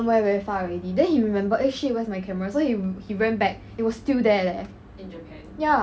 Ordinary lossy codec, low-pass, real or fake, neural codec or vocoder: none; none; real; none